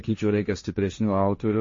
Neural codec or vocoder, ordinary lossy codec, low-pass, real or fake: codec, 16 kHz, 1.1 kbps, Voila-Tokenizer; MP3, 32 kbps; 7.2 kHz; fake